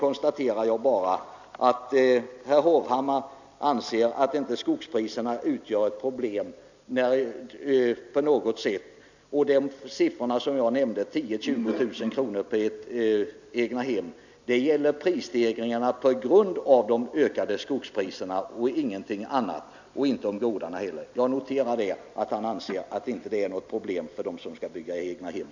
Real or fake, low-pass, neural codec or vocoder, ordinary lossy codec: real; 7.2 kHz; none; none